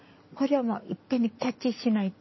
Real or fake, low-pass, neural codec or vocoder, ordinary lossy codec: real; 7.2 kHz; none; MP3, 24 kbps